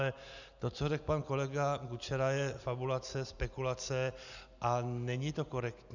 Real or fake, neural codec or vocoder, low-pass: real; none; 7.2 kHz